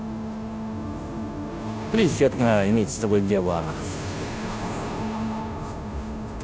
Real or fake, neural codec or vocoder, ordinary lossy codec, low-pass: fake; codec, 16 kHz, 0.5 kbps, FunCodec, trained on Chinese and English, 25 frames a second; none; none